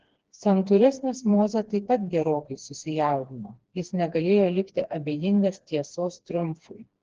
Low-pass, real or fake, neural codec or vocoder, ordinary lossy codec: 7.2 kHz; fake; codec, 16 kHz, 2 kbps, FreqCodec, smaller model; Opus, 16 kbps